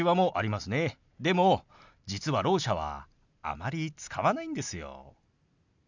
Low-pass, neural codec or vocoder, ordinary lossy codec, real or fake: 7.2 kHz; none; none; real